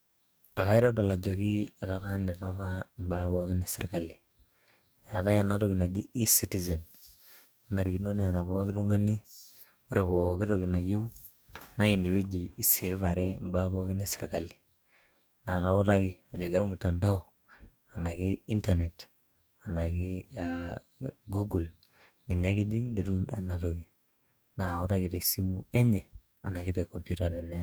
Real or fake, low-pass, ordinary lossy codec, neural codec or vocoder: fake; none; none; codec, 44.1 kHz, 2.6 kbps, DAC